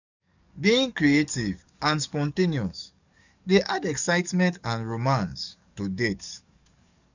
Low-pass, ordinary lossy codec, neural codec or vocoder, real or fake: 7.2 kHz; none; codec, 44.1 kHz, 7.8 kbps, DAC; fake